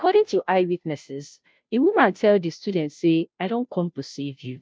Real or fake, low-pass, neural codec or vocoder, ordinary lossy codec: fake; none; codec, 16 kHz, 0.5 kbps, FunCodec, trained on Chinese and English, 25 frames a second; none